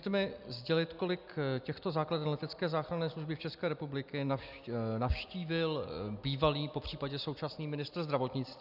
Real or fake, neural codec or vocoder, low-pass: real; none; 5.4 kHz